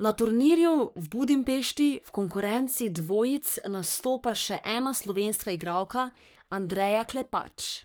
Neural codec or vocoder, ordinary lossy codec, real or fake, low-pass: codec, 44.1 kHz, 3.4 kbps, Pupu-Codec; none; fake; none